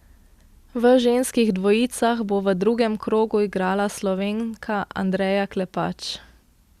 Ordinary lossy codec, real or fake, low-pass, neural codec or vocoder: Opus, 64 kbps; real; 14.4 kHz; none